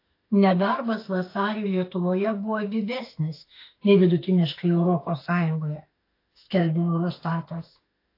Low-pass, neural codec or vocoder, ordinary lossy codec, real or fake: 5.4 kHz; autoencoder, 48 kHz, 32 numbers a frame, DAC-VAE, trained on Japanese speech; AAC, 32 kbps; fake